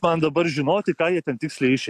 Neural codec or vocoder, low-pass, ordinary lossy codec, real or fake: none; 14.4 kHz; AAC, 96 kbps; real